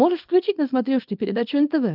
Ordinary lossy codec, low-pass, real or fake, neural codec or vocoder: Opus, 32 kbps; 5.4 kHz; fake; codec, 24 kHz, 0.9 kbps, WavTokenizer, small release